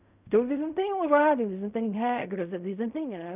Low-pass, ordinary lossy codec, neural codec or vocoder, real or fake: 3.6 kHz; none; codec, 16 kHz in and 24 kHz out, 0.4 kbps, LongCat-Audio-Codec, fine tuned four codebook decoder; fake